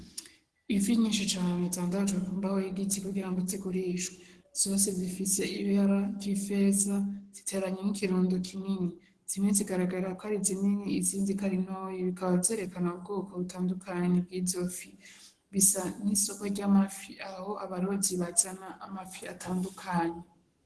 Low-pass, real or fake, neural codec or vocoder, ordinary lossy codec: 10.8 kHz; fake; codec, 44.1 kHz, 7.8 kbps, Pupu-Codec; Opus, 16 kbps